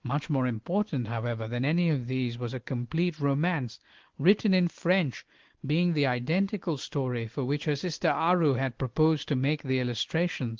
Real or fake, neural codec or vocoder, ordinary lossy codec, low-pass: real; none; Opus, 16 kbps; 7.2 kHz